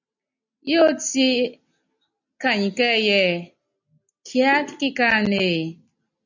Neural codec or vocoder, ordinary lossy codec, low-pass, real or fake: none; MP3, 64 kbps; 7.2 kHz; real